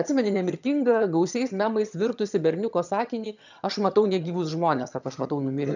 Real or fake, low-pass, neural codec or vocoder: fake; 7.2 kHz; vocoder, 22.05 kHz, 80 mel bands, HiFi-GAN